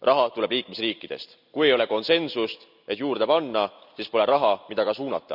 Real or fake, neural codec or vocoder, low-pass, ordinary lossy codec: real; none; 5.4 kHz; none